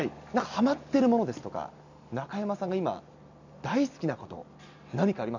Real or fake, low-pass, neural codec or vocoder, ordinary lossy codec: real; 7.2 kHz; none; none